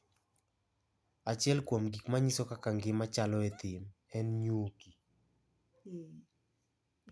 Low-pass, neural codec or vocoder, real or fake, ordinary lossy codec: none; none; real; none